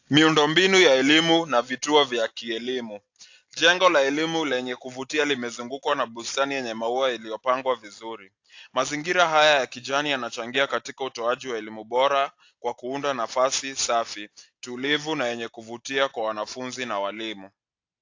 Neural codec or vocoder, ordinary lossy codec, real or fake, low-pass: none; AAC, 48 kbps; real; 7.2 kHz